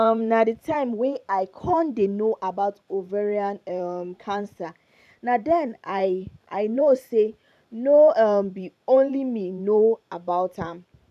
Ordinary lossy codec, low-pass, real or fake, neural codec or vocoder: none; 14.4 kHz; fake; vocoder, 44.1 kHz, 128 mel bands every 512 samples, BigVGAN v2